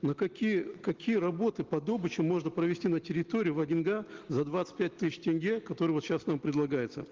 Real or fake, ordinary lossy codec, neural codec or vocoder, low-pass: real; Opus, 16 kbps; none; 7.2 kHz